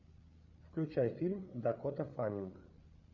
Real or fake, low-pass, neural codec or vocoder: fake; 7.2 kHz; codec, 16 kHz, 8 kbps, FreqCodec, larger model